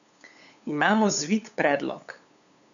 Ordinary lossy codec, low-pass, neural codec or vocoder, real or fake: none; 7.2 kHz; codec, 16 kHz, 8 kbps, FunCodec, trained on LibriTTS, 25 frames a second; fake